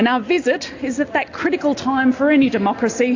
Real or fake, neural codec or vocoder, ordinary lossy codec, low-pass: real; none; AAC, 48 kbps; 7.2 kHz